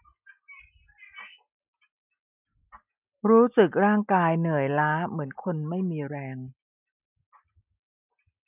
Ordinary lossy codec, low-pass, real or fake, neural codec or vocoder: none; 3.6 kHz; real; none